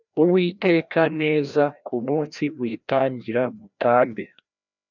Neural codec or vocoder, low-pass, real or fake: codec, 16 kHz, 1 kbps, FreqCodec, larger model; 7.2 kHz; fake